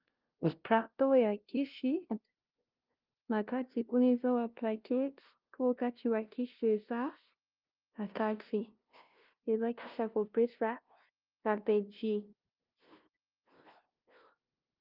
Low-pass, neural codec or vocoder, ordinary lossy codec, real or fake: 5.4 kHz; codec, 16 kHz, 0.5 kbps, FunCodec, trained on LibriTTS, 25 frames a second; Opus, 24 kbps; fake